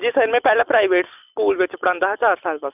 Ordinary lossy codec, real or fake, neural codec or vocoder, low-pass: none; real; none; 3.6 kHz